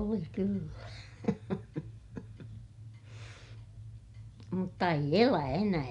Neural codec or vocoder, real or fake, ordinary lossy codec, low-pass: none; real; none; 10.8 kHz